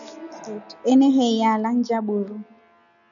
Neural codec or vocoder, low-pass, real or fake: none; 7.2 kHz; real